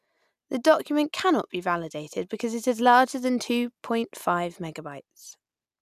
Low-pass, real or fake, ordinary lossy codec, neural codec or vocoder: 14.4 kHz; real; none; none